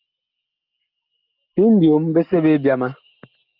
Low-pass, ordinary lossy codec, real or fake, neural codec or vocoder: 5.4 kHz; Opus, 24 kbps; real; none